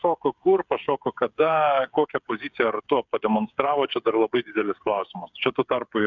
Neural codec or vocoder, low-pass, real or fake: codec, 16 kHz, 8 kbps, FreqCodec, smaller model; 7.2 kHz; fake